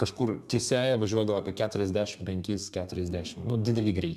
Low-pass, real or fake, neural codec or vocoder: 14.4 kHz; fake; codec, 32 kHz, 1.9 kbps, SNAC